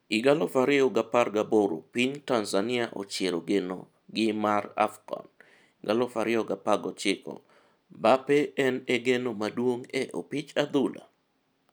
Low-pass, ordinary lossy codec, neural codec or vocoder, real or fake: none; none; none; real